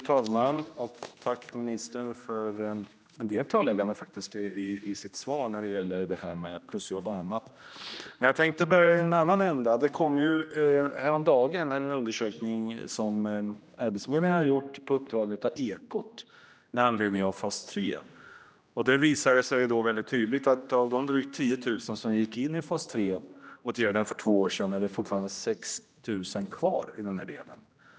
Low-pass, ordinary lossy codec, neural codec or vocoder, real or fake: none; none; codec, 16 kHz, 1 kbps, X-Codec, HuBERT features, trained on general audio; fake